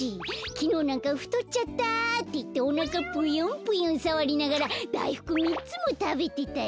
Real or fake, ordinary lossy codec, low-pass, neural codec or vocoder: real; none; none; none